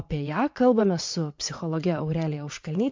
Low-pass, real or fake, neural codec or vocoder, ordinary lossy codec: 7.2 kHz; fake; vocoder, 22.05 kHz, 80 mel bands, WaveNeXt; MP3, 48 kbps